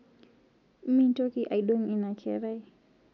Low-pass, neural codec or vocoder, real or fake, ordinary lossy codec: 7.2 kHz; none; real; none